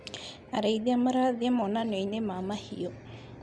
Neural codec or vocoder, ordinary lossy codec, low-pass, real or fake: none; none; none; real